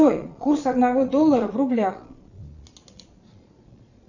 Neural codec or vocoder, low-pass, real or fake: vocoder, 22.05 kHz, 80 mel bands, Vocos; 7.2 kHz; fake